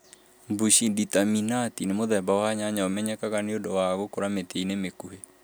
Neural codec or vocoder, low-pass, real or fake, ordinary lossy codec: none; none; real; none